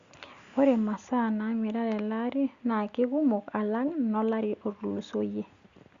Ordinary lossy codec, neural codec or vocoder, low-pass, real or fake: Opus, 64 kbps; none; 7.2 kHz; real